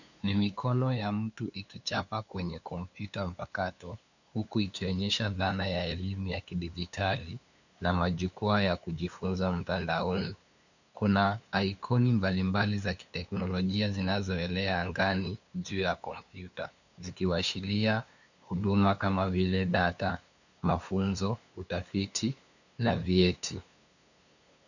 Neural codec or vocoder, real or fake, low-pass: codec, 16 kHz, 2 kbps, FunCodec, trained on LibriTTS, 25 frames a second; fake; 7.2 kHz